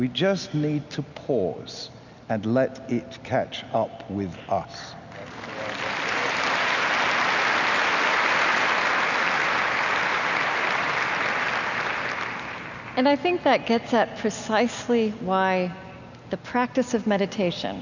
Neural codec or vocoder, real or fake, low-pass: none; real; 7.2 kHz